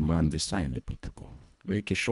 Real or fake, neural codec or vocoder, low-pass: fake; codec, 24 kHz, 1.5 kbps, HILCodec; 10.8 kHz